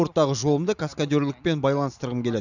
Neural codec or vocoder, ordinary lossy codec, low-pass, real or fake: none; none; 7.2 kHz; real